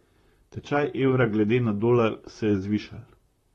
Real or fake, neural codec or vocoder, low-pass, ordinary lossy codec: fake; vocoder, 44.1 kHz, 128 mel bands, Pupu-Vocoder; 19.8 kHz; AAC, 32 kbps